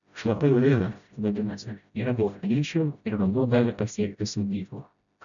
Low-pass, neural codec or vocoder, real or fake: 7.2 kHz; codec, 16 kHz, 0.5 kbps, FreqCodec, smaller model; fake